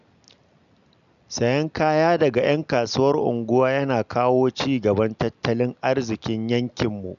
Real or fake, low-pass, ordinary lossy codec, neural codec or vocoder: real; 7.2 kHz; none; none